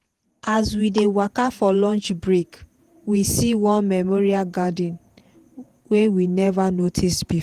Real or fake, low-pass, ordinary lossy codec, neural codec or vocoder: fake; 14.4 kHz; Opus, 24 kbps; vocoder, 48 kHz, 128 mel bands, Vocos